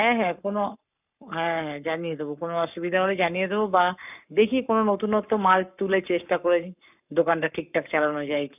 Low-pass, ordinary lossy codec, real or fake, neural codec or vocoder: 3.6 kHz; none; fake; codec, 44.1 kHz, 7.8 kbps, Pupu-Codec